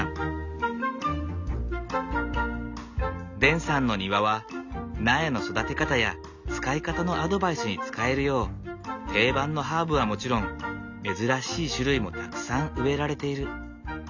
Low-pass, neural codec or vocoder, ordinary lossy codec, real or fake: 7.2 kHz; none; none; real